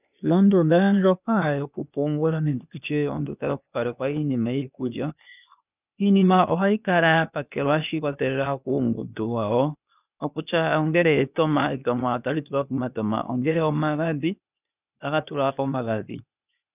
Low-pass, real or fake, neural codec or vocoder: 3.6 kHz; fake; codec, 16 kHz, 0.8 kbps, ZipCodec